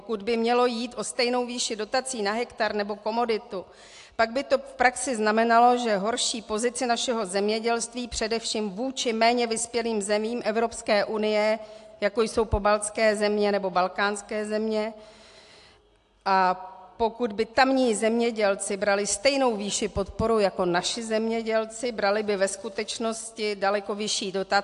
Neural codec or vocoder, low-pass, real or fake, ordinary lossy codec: none; 10.8 kHz; real; AAC, 64 kbps